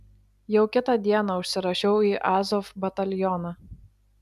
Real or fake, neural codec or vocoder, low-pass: real; none; 14.4 kHz